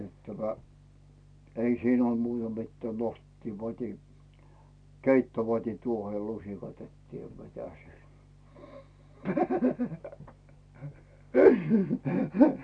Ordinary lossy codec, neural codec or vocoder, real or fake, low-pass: none; none; real; 9.9 kHz